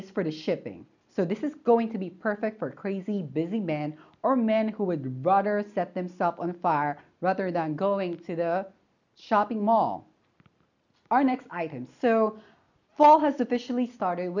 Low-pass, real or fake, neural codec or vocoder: 7.2 kHz; real; none